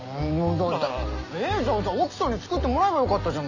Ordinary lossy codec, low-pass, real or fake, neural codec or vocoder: none; 7.2 kHz; real; none